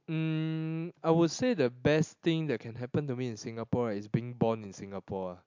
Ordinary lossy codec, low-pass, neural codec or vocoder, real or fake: MP3, 64 kbps; 7.2 kHz; none; real